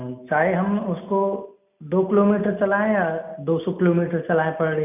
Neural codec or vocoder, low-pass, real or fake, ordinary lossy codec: none; 3.6 kHz; real; none